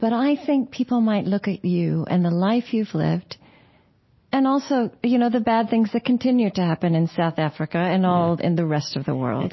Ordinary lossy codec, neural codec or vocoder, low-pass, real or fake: MP3, 24 kbps; none; 7.2 kHz; real